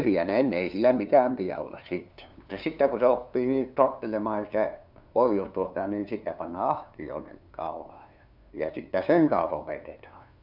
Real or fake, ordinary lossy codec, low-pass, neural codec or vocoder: fake; none; 5.4 kHz; codec, 16 kHz, 2 kbps, FunCodec, trained on LibriTTS, 25 frames a second